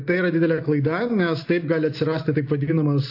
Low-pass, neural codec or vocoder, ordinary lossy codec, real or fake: 5.4 kHz; none; AAC, 32 kbps; real